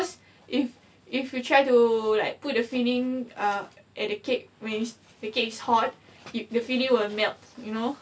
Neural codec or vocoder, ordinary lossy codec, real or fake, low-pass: none; none; real; none